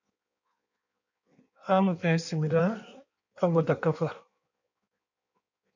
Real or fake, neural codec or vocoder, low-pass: fake; codec, 16 kHz in and 24 kHz out, 1.1 kbps, FireRedTTS-2 codec; 7.2 kHz